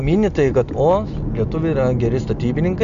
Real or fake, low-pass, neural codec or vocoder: real; 7.2 kHz; none